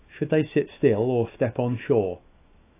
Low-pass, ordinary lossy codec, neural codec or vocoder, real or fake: 3.6 kHz; AAC, 24 kbps; autoencoder, 48 kHz, 128 numbers a frame, DAC-VAE, trained on Japanese speech; fake